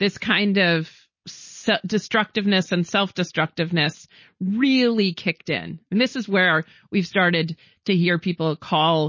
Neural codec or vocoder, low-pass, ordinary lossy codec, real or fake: none; 7.2 kHz; MP3, 32 kbps; real